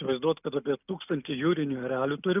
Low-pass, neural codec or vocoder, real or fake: 3.6 kHz; none; real